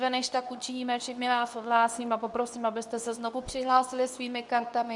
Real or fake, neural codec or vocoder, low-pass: fake; codec, 24 kHz, 0.9 kbps, WavTokenizer, medium speech release version 2; 10.8 kHz